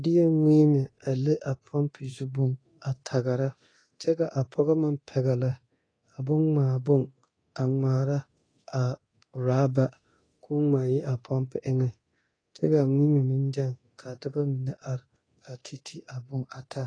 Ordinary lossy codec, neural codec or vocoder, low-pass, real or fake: AAC, 32 kbps; codec, 24 kHz, 0.9 kbps, DualCodec; 9.9 kHz; fake